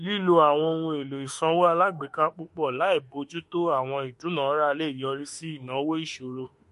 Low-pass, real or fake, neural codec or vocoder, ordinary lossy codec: 14.4 kHz; fake; autoencoder, 48 kHz, 32 numbers a frame, DAC-VAE, trained on Japanese speech; MP3, 48 kbps